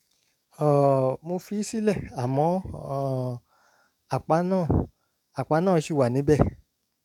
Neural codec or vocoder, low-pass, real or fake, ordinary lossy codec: codec, 44.1 kHz, 7.8 kbps, DAC; 19.8 kHz; fake; none